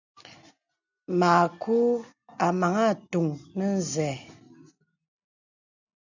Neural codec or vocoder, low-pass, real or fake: none; 7.2 kHz; real